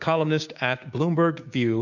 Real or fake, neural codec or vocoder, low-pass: fake; codec, 16 kHz, 6 kbps, DAC; 7.2 kHz